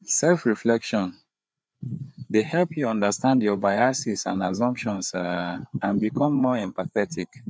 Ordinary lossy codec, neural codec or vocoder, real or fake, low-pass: none; codec, 16 kHz, 4 kbps, FreqCodec, larger model; fake; none